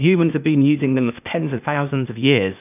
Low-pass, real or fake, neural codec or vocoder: 3.6 kHz; fake; codec, 16 kHz, 0.8 kbps, ZipCodec